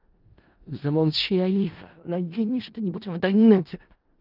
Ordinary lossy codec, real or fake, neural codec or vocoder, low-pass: Opus, 32 kbps; fake; codec, 16 kHz in and 24 kHz out, 0.4 kbps, LongCat-Audio-Codec, four codebook decoder; 5.4 kHz